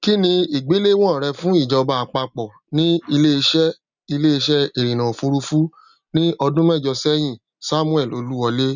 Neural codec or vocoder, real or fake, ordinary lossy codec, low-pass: none; real; none; 7.2 kHz